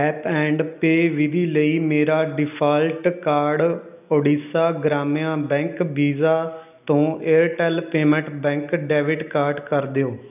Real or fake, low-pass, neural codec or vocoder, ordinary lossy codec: real; 3.6 kHz; none; none